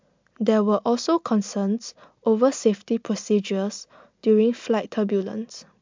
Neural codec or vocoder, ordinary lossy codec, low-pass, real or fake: none; MP3, 64 kbps; 7.2 kHz; real